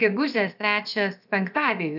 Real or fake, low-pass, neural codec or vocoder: fake; 5.4 kHz; codec, 16 kHz, about 1 kbps, DyCAST, with the encoder's durations